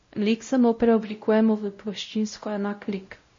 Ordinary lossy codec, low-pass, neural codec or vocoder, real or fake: MP3, 32 kbps; 7.2 kHz; codec, 16 kHz, 0.5 kbps, X-Codec, WavLM features, trained on Multilingual LibriSpeech; fake